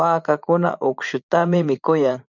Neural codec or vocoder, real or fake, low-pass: vocoder, 44.1 kHz, 80 mel bands, Vocos; fake; 7.2 kHz